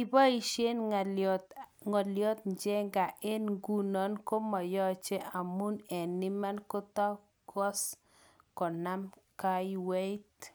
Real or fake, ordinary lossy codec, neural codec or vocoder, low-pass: real; none; none; none